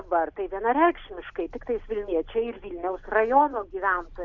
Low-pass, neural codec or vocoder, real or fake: 7.2 kHz; none; real